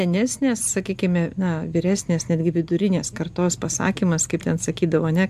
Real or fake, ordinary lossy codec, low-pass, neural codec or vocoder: real; AAC, 96 kbps; 14.4 kHz; none